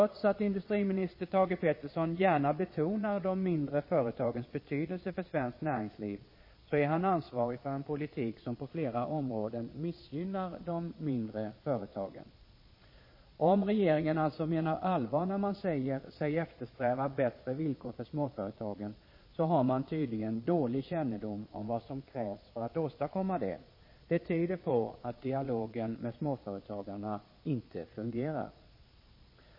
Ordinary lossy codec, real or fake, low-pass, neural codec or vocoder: MP3, 24 kbps; fake; 5.4 kHz; vocoder, 22.05 kHz, 80 mel bands, Vocos